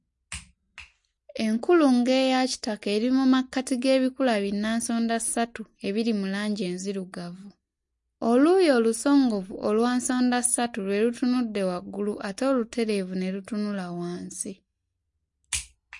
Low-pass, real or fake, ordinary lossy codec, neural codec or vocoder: 10.8 kHz; real; MP3, 48 kbps; none